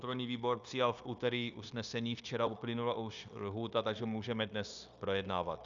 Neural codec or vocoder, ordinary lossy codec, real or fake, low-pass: codec, 16 kHz, 0.9 kbps, LongCat-Audio-Codec; Opus, 64 kbps; fake; 7.2 kHz